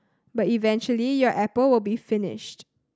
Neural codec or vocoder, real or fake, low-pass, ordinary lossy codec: none; real; none; none